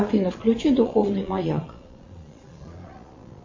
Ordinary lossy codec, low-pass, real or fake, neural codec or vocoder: MP3, 32 kbps; 7.2 kHz; fake; vocoder, 22.05 kHz, 80 mel bands, Vocos